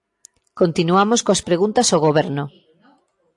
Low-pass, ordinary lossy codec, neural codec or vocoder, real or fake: 10.8 kHz; AAC, 64 kbps; none; real